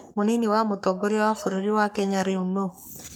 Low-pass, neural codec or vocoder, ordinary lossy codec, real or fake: none; codec, 44.1 kHz, 3.4 kbps, Pupu-Codec; none; fake